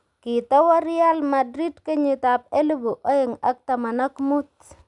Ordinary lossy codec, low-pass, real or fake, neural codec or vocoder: none; 10.8 kHz; real; none